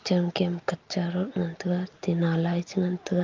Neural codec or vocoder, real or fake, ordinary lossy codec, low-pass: none; real; Opus, 32 kbps; 7.2 kHz